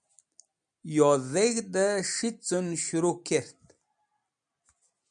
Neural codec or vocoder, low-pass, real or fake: none; 9.9 kHz; real